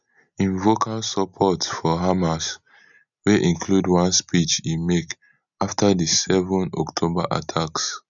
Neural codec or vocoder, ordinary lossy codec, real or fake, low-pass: none; none; real; 7.2 kHz